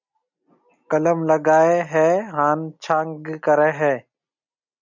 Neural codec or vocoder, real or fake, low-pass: none; real; 7.2 kHz